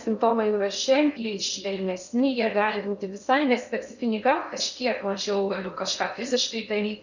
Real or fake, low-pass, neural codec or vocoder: fake; 7.2 kHz; codec, 16 kHz in and 24 kHz out, 0.6 kbps, FocalCodec, streaming, 2048 codes